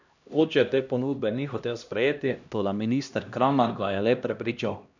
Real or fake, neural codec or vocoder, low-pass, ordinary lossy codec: fake; codec, 16 kHz, 1 kbps, X-Codec, HuBERT features, trained on LibriSpeech; 7.2 kHz; none